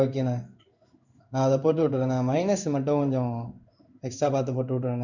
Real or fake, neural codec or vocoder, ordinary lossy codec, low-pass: fake; codec, 16 kHz in and 24 kHz out, 1 kbps, XY-Tokenizer; none; 7.2 kHz